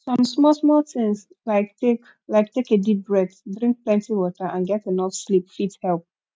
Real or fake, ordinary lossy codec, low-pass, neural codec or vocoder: real; none; none; none